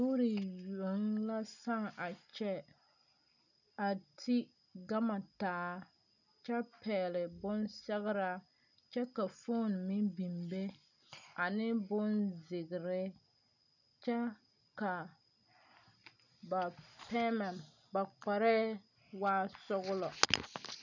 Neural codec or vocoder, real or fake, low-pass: none; real; 7.2 kHz